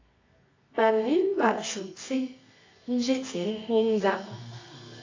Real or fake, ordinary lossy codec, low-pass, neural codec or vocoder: fake; AAC, 32 kbps; 7.2 kHz; codec, 24 kHz, 0.9 kbps, WavTokenizer, medium music audio release